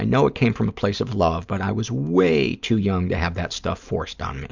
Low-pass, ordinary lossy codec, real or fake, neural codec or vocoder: 7.2 kHz; Opus, 64 kbps; real; none